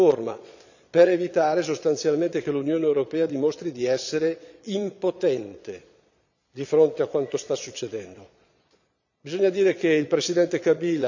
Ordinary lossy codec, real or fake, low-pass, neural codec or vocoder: none; fake; 7.2 kHz; vocoder, 22.05 kHz, 80 mel bands, Vocos